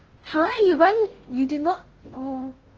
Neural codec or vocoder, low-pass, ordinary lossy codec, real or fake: codec, 16 kHz, 0.5 kbps, FunCodec, trained on Chinese and English, 25 frames a second; 7.2 kHz; Opus, 16 kbps; fake